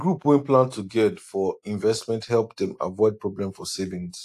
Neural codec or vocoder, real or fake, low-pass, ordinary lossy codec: none; real; 14.4 kHz; AAC, 64 kbps